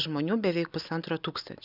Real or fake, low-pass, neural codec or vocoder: real; 5.4 kHz; none